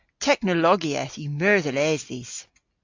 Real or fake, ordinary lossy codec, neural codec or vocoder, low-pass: real; AAC, 48 kbps; none; 7.2 kHz